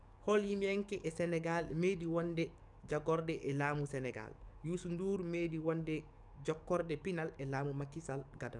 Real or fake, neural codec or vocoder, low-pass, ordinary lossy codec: fake; codec, 44.1 kHz, 7.8 kbps, DAC; 10.8 kHz; none